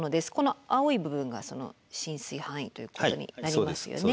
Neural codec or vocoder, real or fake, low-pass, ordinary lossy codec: none; real; none; none